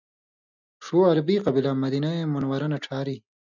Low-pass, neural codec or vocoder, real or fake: 7.2 kHz; none; real